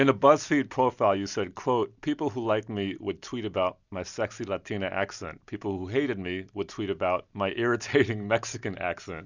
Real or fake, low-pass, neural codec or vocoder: real; 7.2 kHz; none